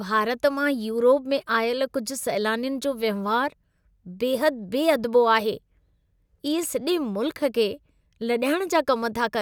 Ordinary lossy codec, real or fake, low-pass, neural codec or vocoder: none; real; none; none